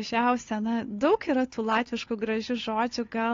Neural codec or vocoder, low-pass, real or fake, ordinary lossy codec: codec, 16 kHz, 8 kbps, FunCodec, trained on Chinese and English, 25 frames a second; 7.2 kHz; fake; AAC, 32 kbps